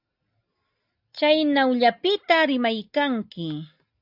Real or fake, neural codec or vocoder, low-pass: real; none; 5.4 kHz